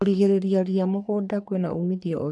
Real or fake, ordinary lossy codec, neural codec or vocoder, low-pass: fake; none; codec, 44.1 kHz, 3.4 kbps, Pupu-Codec; 10.8 kHz